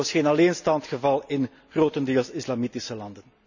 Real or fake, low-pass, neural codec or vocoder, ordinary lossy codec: real; 7.2 kHz; none; none